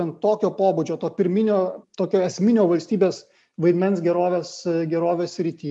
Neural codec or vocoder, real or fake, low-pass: none; real; 10.8 kHz